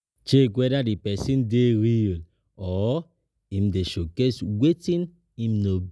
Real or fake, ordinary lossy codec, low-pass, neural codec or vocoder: real; none; none; none